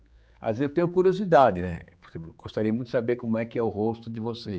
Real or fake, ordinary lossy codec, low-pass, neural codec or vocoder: fake; none; none; codec, 16 kHz, 4 kbps, X-Codec, HuBERT features, trained on general audio